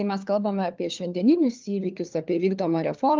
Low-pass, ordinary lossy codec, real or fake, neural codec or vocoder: 7.2 kHz; Opus, 32 kbps; fake; codec, 16 kHz, 4 kbps, FunCodec, trained on LibriTTS, 50 frames a second